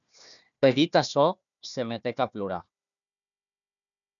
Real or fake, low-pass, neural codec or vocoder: fake; 7.2 kHz; codec, 16 kHz, 1 kbps, FunCodec, trained on Chinese and English, 50 frames a second